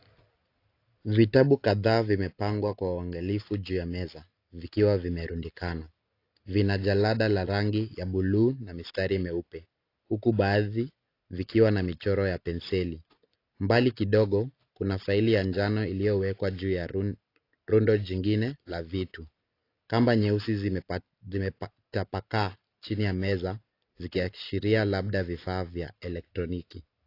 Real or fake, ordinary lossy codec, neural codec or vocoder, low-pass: real; AAC, 32 kbps; none; 5.4 kHz